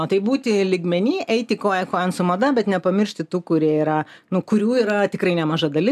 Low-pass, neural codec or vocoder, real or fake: 14.4 kHz; none; real